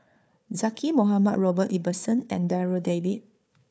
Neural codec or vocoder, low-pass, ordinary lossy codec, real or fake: codec, 16 kHz, 4 kbps, FunCodec, trained on Chinese and English, 50 frames a second; none; none; fake